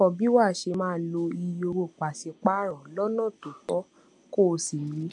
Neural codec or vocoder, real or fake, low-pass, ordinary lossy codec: none; real; 10.8 kHz; MP3, 64 kbps